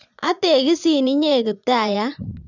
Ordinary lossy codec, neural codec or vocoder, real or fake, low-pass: none; vocoder, 22.05 kHz, 80 mel bands, Vocos; fake; 7.2 kHz